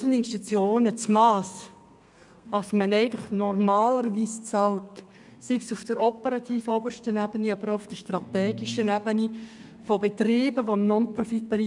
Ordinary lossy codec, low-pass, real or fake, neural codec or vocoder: none; 10.8 kHz; fake; codec, 32 kHz, 1.9 kbps, SNAC